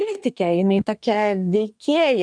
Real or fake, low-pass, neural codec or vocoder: fake; 9.9 kHz; codec, 24 kHz, 1 kbps, SNAC